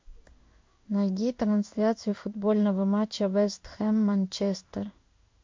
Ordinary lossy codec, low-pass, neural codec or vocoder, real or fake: MP3, 48 kbps; 7.2 kHz; codec, 16 kHz in and 24 kHz out, 1 kbps, XY-Tokenizer; fake